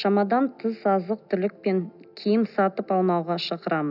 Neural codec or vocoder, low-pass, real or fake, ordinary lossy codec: none; 5.4 kHz; real; none